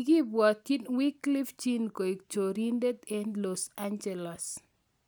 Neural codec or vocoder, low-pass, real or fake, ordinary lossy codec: none; none; real; none